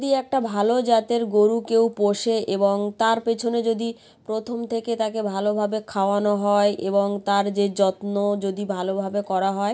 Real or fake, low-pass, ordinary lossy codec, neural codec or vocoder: real; none; none; none